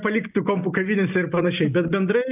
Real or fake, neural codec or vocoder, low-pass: real; none; 3.6 kHz